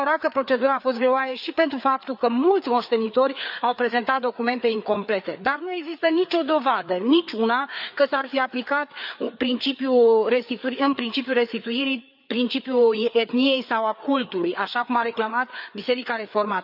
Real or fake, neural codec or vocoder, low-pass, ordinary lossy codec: fake; codec, 16 kHz, 4 kbps, FreqCodec, larger model; 5.4 kHz; none